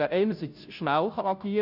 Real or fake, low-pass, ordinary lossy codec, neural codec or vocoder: fake; 5.4 kHz; none; codec, 16 kHz, 0.5 kbps, FunCodec, trained on Chinese and English, 25 frames a second